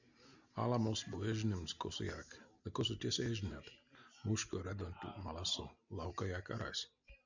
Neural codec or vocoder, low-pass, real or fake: none; 7.2 kHz; real